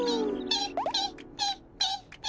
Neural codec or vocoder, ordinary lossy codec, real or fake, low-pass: none; none; real; none